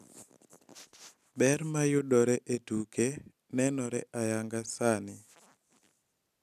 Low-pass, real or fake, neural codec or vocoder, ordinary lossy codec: 14.4 kHz; real; none; none